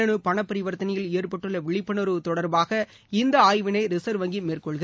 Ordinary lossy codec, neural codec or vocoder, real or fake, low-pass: none; none; real; none